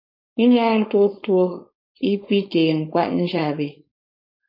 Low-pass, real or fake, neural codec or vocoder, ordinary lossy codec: 5.4 kHz; fake; codec, 16 kHz, 4.8 kbps, FACodec; MP3, 32 kbps